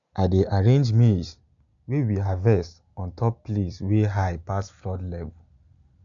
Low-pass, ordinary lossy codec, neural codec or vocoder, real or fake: 7.2 kHz; none; none; real